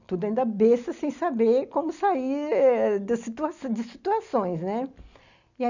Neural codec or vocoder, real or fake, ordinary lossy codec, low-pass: none; real; none; 7.2 kHz